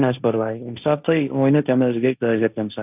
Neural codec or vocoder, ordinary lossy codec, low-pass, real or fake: codec, 16 kHz, 1.1 kbps, Voila-Tokenizer; none; 3.6 kHz; fake